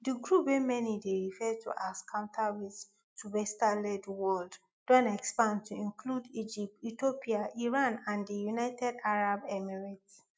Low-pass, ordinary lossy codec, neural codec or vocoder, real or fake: none; none; none; real